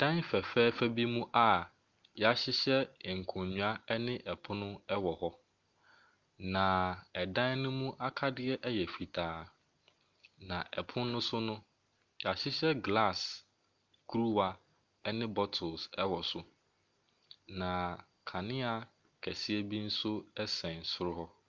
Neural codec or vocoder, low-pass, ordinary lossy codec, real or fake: none; 7.2 kHz; Opus, 24 kbps; real